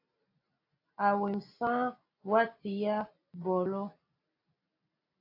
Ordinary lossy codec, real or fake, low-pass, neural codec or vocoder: AAC, 32 kbps; real; 5.4 kHz; none